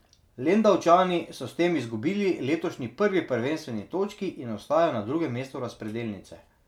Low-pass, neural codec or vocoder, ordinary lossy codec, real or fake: 19.8 kHz; none; none; real